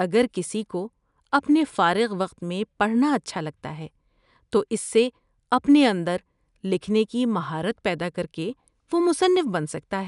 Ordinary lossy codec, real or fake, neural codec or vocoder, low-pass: none; real; none; 10.8 kHz